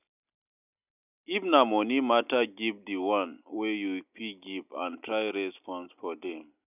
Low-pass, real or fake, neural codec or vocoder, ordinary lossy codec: 3.6 kHz; real; none; none